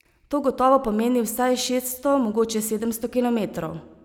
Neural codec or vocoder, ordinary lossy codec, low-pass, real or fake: none; none; none; real